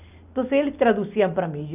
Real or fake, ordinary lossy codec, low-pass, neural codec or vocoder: real; none; 3.6 kHz; none